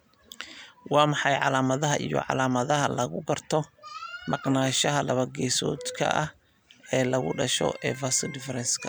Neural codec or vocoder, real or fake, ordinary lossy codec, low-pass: none; real; none; none